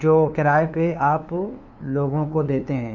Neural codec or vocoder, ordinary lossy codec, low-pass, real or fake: codec, 16 kHz, 2 kbps, FunCodec, trained on LibriTTS, 25 frames a second; none; 7.2 kHz; fake